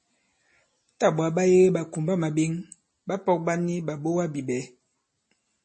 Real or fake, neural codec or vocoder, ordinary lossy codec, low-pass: real; none; MP3, 32 kbps; 10.8 kHz